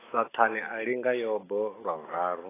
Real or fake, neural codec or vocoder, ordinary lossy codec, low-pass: real; none; AAC, 16 kbps; 3.6 kHz